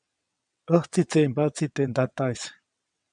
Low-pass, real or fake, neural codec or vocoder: 9.9 kHz; fake; vocoder, 22.05 kHz, 80 mel bands, WaveNeXt